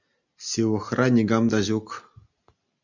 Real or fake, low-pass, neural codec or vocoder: real; 7.2 kHz; none